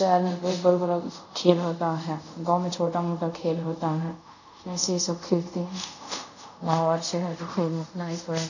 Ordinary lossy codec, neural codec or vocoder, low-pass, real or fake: none; codec, 24 kHz, 0.5 kbps, DualCodec; 7.2 kHz; fake